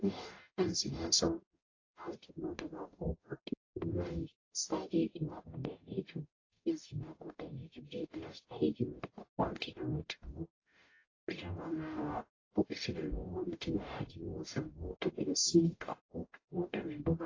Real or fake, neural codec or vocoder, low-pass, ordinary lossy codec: fake; codec, 44.1 kHz, 0.9 kbps, DAC; 7.2 kHz; AAC, 32 kbps